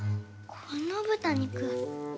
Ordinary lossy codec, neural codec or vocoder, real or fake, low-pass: none; none; real; none